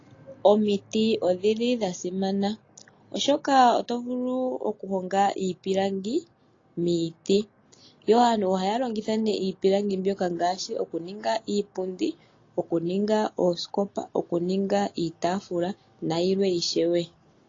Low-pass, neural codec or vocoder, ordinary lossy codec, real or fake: 7.2 kHz; none; AAC, 32 kbps; real